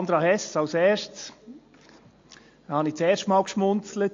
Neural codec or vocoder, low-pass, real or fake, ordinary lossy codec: none; 7.2 kHz; real; AAC, 48 kbps